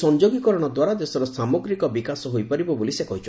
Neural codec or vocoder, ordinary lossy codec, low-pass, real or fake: none; none; none; real